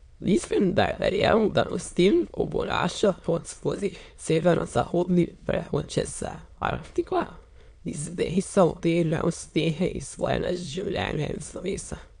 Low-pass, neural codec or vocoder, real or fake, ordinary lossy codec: 9.9 kHz; autoencoder, 22.05 kHz, a latent of 192 numbers a frame, VITS, trained on many speakers; fake; MP3, 64 kbps